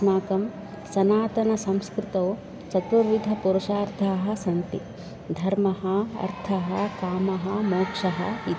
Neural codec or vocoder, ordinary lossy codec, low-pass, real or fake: none; none; none; real